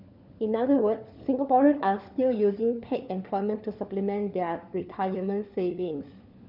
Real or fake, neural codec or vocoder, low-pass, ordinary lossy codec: fake; codec, 16 kHz, 4 kbps, FunCodec, trained on LibriTTS, 50 frames a second; 5.4 kHz; none